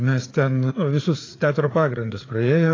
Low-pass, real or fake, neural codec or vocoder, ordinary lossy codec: 7.2 kHz; fake; codec, 16 kHz, 4 kbps, FreqCodec, larger model; AAC, 32 kbps